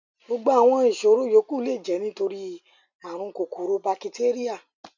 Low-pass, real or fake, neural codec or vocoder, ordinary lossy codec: 7.2 kHz; real; none; none